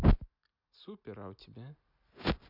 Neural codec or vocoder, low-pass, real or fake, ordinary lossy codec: none; 5.4 kHz; real; none